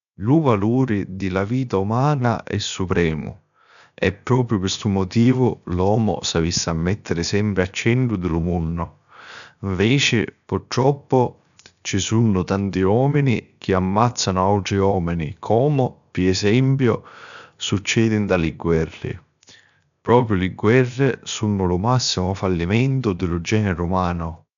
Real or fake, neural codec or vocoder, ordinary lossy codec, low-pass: fake; codec, 16 kHz, 0.7 kbps, FocalCodec; none; 7.2 kHz